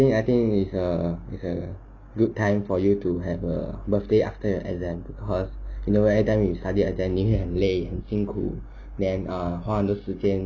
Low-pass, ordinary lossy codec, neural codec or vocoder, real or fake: 7.2 kHz; none; none; real